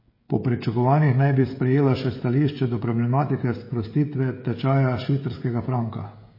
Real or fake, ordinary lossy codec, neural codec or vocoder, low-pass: fake; MP3, 24 kbps; codec, 16 kHz, 16 kbps, FreqCodec, smaller model; 5.4 kHz